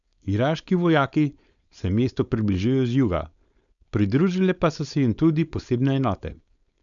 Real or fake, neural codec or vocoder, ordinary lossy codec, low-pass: fake; codec, 16 kHz, 4.8 kbps, FACodec; none; 7.2 kHz